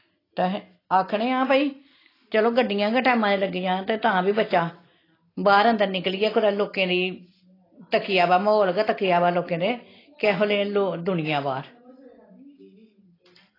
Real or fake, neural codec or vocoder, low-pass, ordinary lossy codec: real; none; 5.4 kHz; AAC, 24 kbps